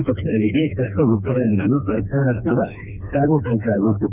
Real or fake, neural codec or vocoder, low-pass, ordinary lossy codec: fake; codec, 16 kHz, 2 kbps, FreqCodec, smaller model; 3.6 kHz; none